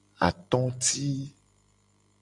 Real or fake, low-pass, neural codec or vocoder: real; 10.8 kHz; none